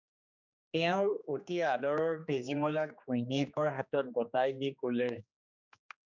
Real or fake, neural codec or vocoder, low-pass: fake; codec, 16 kHz, 2 kbps, X-Codec, HuBERT features, trained on general audio; 7.2 kHz